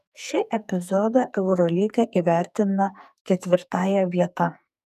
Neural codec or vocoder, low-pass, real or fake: codec, 44.1 kHz, 2.6 kbps, SNAC; 14.4 kHz; fake